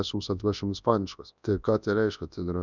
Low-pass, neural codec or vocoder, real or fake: 7.2 kHz; codec, 24 kHz, 0.9 kbps, WavTokenizer, large speech release; fake